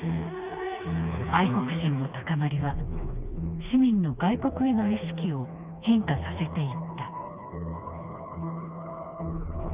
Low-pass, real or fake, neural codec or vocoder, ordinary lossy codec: 3.6 kHz; fake; codec, 16 kHz, 2 kbps, FreqCodec, smaller model; Opus, 64 kbps